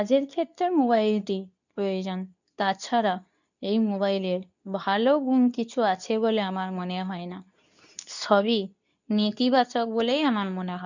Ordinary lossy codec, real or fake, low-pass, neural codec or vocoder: none; fake; 7.2 kHz; codec, 24 kHz, 0.9 kbps, WavTokenizer, medium speech release version 2